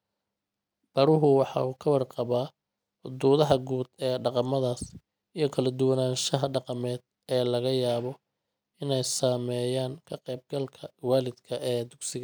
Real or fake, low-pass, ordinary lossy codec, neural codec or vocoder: real; none; none; none